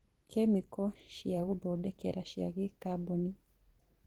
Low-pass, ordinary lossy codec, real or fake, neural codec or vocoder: 19.8 kHz; Opus, 16 kbps; fake; vocoder, 44.1 kHz, 128 mel bands every 512 samples, BigVGAN v2